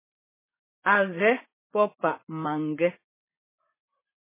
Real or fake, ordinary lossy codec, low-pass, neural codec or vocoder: fake; MP3, 16 kbps; 3.6 kHz; vocoder, 44.1 kHz, 80 mel bands, Vocos